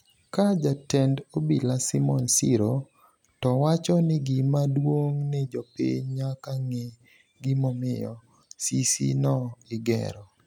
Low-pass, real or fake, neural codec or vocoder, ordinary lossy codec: 19.8 kHz; real; none; none